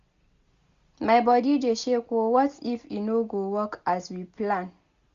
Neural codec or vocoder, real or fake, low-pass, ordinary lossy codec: none; real; 7.2 kHz; Opus, 64 kbps